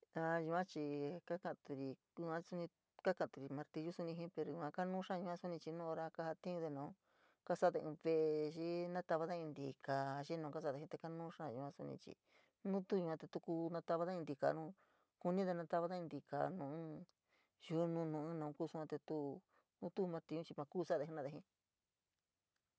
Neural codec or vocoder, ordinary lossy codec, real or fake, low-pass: none; none; real; none